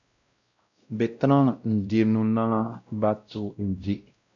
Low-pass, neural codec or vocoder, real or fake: 7.2 kHz; codec, 16 kHz, 0.5 kbps, X-Codec, WavLM features, trained on Multilingual LibriSpeech; fake